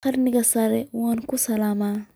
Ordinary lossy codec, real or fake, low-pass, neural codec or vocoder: none; real; none; none